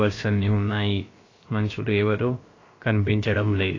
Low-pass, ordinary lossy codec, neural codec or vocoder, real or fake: 7.2 kHz; AAC, 32 kbps; codec, 16 kHz, about 1 kbps, DyCAST, with the encoder's durations; fake